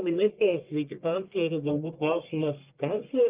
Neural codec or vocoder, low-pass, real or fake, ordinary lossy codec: codec, 44.1 kHz, 1.7 kbps, Pupu-Codec; 3.6 kHz; fake; Opus, 32 kbps